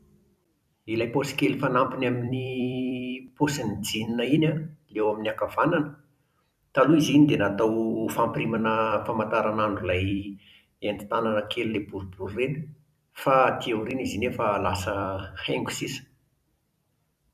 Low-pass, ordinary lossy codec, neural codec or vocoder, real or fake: 14.4 kHz; none; vocoder, 44.1 kHz, 128 mel bands every 256 samples, BigVGAN v2; fake